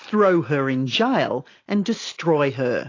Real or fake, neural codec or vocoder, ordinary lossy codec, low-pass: real; none; AAC, 32 kbps; 7.2 kHz